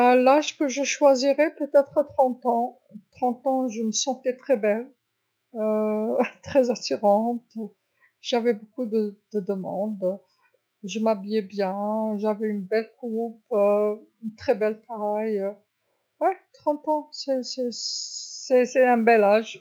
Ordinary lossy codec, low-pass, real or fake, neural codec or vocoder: none; none; fake; autoencoder, 48 kHz, 128 numbers a frame, DAC-VAE, trained on Japanese speech